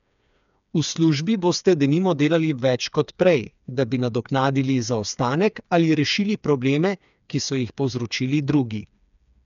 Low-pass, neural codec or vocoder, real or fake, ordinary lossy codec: 7.2 kHz; codec, 16 kHz, 4 kbps, FreqCodec, smaller model; fake; none